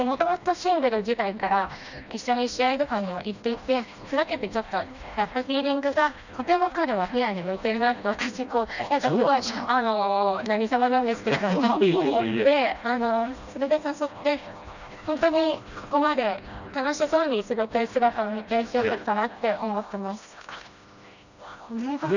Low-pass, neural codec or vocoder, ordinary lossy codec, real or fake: 7.2 kHz; codec, 16 kHz, 1 kbps, FreqCodec, smaller model; none; fake